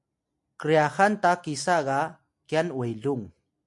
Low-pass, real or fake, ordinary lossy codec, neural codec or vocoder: 10.8 kHz; real; MP3, 64 kbps; none